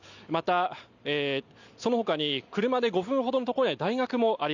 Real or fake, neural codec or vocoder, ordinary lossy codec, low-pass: real; none; none; 7.2 kHz